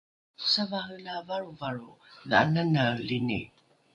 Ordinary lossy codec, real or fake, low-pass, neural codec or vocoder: AAC, 64 kbps; fake; 10.8 kHz; vocoder, 24 kHz, 100 mel bands, Vocos